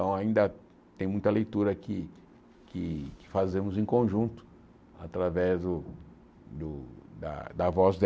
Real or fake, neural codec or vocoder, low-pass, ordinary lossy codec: real; none; none; none